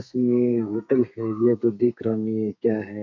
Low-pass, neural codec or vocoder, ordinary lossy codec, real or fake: 7.2 kHz; codec, 32 kHz, 1.9 kbps, SNAC; MP3, 48 kbps; fake